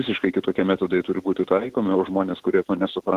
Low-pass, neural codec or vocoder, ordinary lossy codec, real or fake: 14.4 kHz; none; Opus, 16 kbps; real